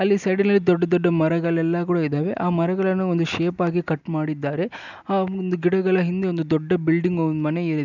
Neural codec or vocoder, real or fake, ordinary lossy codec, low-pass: none; real; none; 7.2 kHz